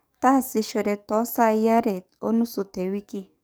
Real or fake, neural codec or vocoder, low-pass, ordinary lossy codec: fake; codec, 44.1 kHz, 7.8 kbps, DAC; none; none